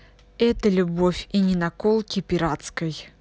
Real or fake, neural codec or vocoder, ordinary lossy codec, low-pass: real; none; none; none